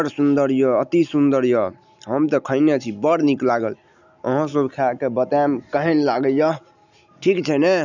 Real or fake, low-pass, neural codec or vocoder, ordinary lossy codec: real; 7.2 kHz; none; none